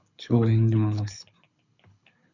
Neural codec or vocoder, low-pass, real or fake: codec, 24 kHz, 6 kbps, HILCodec; 7.2 kHz; fake